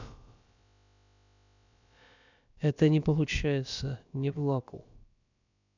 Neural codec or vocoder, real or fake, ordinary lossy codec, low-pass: codec, 16 kHz, about 1 kbps, DyCAST, with the encoder's durations; fake; none; 7.2 kHz